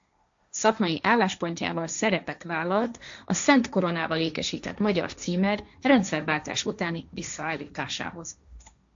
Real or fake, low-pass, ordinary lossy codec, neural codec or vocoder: fake; 7.2 kHz; AAC, 64 kbps; codec, 16 kHz, 1.1 kbps, Voila-Tokenizer